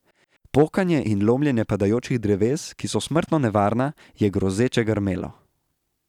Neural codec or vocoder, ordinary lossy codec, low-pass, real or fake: none; none; 19.8 kHz; real